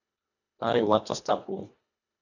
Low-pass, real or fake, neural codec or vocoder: 7.2 kHz; fake; codec, 24 kHz, 1.5 kbps, HILCodec